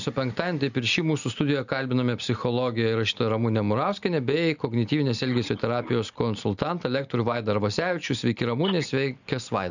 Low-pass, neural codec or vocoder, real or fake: 7.2 kHz; none; real